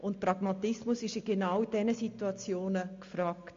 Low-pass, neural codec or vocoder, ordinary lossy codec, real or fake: 7.2 kHz; none; MP3, 48 kbps; real